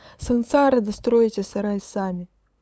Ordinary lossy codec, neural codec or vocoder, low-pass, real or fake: none; codec, 16 kHz, 8 kbps, FunCodec, trained on LibriTTS, 25 frames a second; none; fake